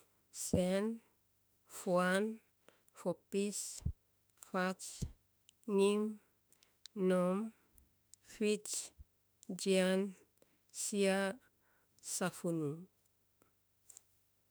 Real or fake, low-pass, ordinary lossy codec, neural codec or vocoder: fake; none; none; autoencoder, 48 kHz, 32 numbers a frame, DAC-VAE, trained on Japanese speech